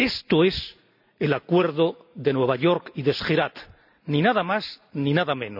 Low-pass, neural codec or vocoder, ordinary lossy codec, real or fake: 5.4 kHz; none; none; real